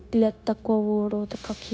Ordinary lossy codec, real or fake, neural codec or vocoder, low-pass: none; fake; codec, 16 kHz, 0.9 kbps, LongCat-Audio-Codec; none